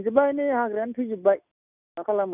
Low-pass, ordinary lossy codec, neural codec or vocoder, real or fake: 3.6 kHz; none; none; real